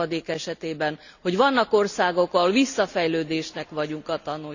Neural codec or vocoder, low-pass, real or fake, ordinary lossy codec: none; 7.2 kHz; real; none